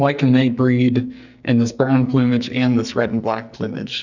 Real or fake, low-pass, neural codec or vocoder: fake; 7.2 kHz; codec, 44.1 kHz, 2.6 kbps, SNAC